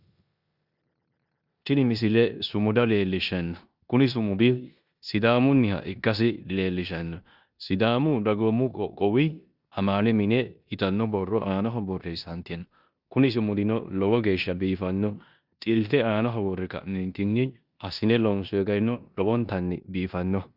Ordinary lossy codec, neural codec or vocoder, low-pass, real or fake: Opus, 64 kbps; codec, 16 kHz in and 24 kHz out, 0.9 kbps, LongCat-Audio-Codec, four codebook decoder; 5.4 kHz; fake